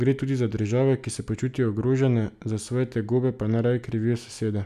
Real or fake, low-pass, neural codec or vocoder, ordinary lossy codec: real; 14.4 kHz; none; none